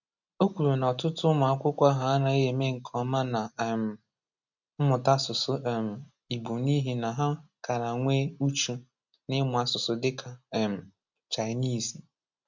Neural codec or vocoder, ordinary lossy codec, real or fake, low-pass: none; none; real; 7.2 kHz